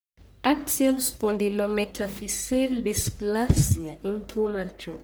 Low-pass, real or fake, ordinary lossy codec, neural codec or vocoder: none; fake; none; codec, 44.1 kHz, 1.7 kbps, Pupu-Codec